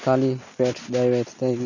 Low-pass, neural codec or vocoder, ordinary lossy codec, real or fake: 7.2 kHz; none; none; real